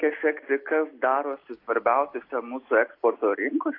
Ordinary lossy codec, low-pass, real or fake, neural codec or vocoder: AAC, 32 kbps; 5.4 kHz; real; none